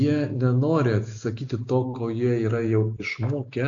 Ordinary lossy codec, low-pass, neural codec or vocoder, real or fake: AAC, 48 kbps; 7.2 kHz; none; real